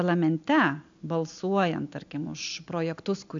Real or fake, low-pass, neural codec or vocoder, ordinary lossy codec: real; 7.2 kHz; none; MP3, 64 kbps